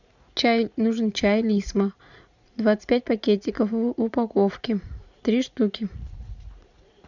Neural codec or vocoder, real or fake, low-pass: vocoder, 44.1 kHz, 80 mel bands, Vocos; fake; 7.2 kHz